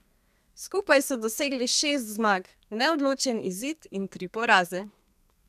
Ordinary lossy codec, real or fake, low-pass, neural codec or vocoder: none; fake; 14.4 kHz; codec, 32 kHz, 1.9 kbps, SNAC